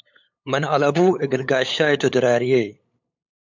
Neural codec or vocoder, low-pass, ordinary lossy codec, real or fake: codec, 16 kHz, 8 kbps, FunCodec, trained on LibriTTS, 25 frames a second; 7.2 kHz; MP3, 64 kbps; fake